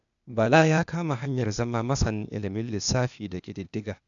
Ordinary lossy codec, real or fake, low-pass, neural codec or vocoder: none; fake; 7.2 kHz; codec, 16 kHz, 0.8 kbps, ZipCodec